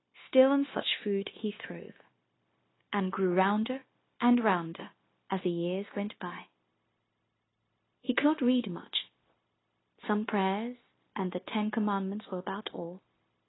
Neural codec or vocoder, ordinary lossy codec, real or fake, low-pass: codec, 16 kHz, 0.9 kbps, LongCat-Audio-Codec; AAC, 16 kbps; fake; 7.2 kHz